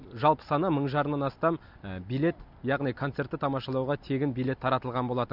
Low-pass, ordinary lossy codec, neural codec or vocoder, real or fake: 5.4 kHz; none; none; real